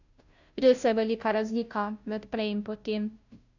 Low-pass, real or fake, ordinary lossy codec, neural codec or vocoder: 7.2 kHz; fake; none; codec, 16 kHz, 0.5 kbps, FunCodec, trained on Chinese and English, 25 frames a second